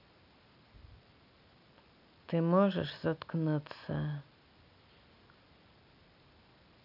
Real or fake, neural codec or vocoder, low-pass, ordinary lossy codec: real; none; 5.4 kHz; none